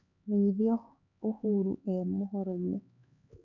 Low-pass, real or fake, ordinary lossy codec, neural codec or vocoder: 7.2 kHz; fake; none; codec, 16 kHz, 4 kbps, X-Codec, HuBERT features, trained on LibriSpeech